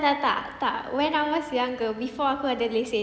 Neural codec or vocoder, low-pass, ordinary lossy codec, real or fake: none; none; none; real